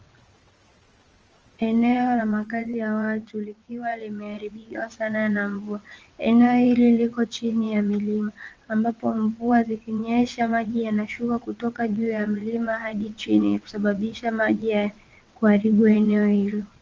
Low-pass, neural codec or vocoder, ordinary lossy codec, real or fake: 7.2 kHz; vocoder, 22.05 kHz, 80 mel bands, WaveNeXt; Opus, 24 kbps; fake